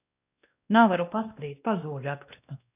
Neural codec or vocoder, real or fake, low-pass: codec, 16 kHz, 1 kbps, X-Codec, WavLM features, trained on Multilingual LibriSpeech; fake; 3.6 kHz